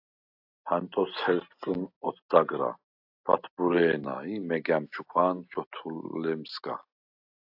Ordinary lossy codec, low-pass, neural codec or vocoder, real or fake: AAC, 48 kbps; 5.4 kHz; none; real